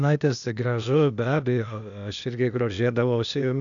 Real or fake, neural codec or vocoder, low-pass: fake; codec, 16 kHz, 0.8 kbps, ZipCodec; 7.2 kHz